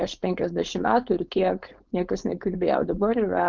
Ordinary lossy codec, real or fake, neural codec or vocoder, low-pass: Opus, 24 kbps; fake; codec, 16 kHz, 4.8 kbps, FACodec; 7.2 kHz